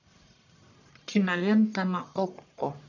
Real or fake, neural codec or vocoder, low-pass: fake; codec, 44.1 kHz, 1.7 kbps, Pupu-Codec; 7.2 kHz